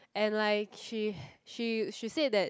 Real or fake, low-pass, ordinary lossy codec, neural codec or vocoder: real; none; none; none